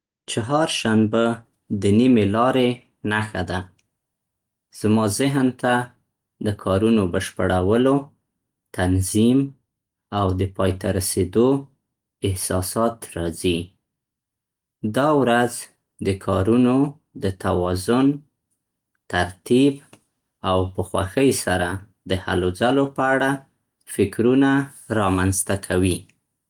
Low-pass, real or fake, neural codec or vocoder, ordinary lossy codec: 19.8 kHz; real; none; Opus, 24 kbps